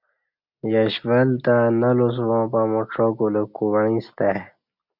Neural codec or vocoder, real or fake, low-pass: none; real; 5.4 kHz